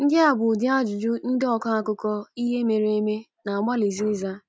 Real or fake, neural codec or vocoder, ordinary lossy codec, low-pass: real; none; none; none